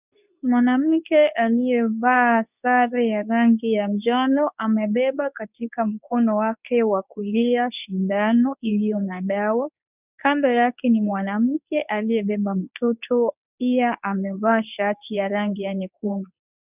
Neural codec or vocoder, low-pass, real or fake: codec, 24 kHz, 0.9 kbps, WavTokenizer, medium speech release version 2; 3.6 kHz; fake